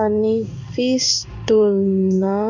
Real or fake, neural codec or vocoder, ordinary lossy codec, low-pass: fake; codec, 16 kHz in and 24 kHz out, 1 kbps, XY-Tokenizer; none; 7.2 kHz